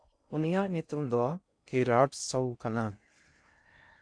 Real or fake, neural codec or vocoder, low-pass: fake; codec, 16 kHz in and 24 kHz out, 0.6 kbps, FocalCodec, streaming, 4096 codes; 9.9 kHz